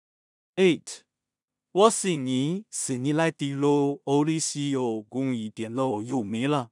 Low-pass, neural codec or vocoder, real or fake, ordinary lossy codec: 10.8 kHz; codec, 16 kHz in and 24 kHz out, 0.4 kbps, LongCat-Audio-Codec, two codebook decoder; fake; none